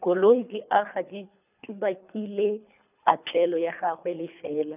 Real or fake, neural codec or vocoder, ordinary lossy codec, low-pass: fake; codec, 24 kHz, 3 kbps, HILCodec; none; 3.6 kHz